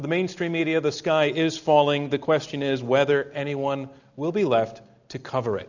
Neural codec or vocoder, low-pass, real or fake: none; 7.2 kHz; real